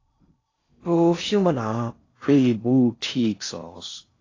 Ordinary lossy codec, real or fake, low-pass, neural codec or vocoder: AAC, 32 kbps; fake; 7.2 kHz; codec, 16 kHz in and 24 kHz out, 0.6 kbps, FocalCodec, streaming, 4096 codes